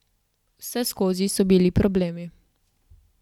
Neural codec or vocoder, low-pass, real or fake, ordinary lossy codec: none; 19.8 kHz; real; none